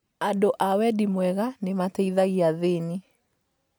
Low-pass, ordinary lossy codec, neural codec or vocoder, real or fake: none; none; none; real